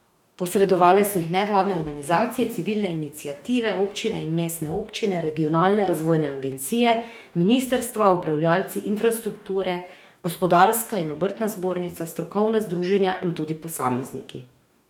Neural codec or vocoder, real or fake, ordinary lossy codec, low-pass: codec, 44.1 kHz, 2.6 kbps, DAC; fake; none; 19.8 kHz